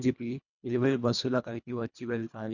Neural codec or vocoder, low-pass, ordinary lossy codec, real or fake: codec, 24 kHz, 1.5 kbps, HILCodec; 7.2 kHz; none; fake